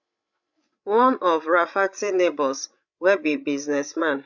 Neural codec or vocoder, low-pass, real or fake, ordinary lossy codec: codec, 16 kHz, 16 kbps, FreqCodec, larger model; 7.2 kHz; fake; MP3, 64 kbps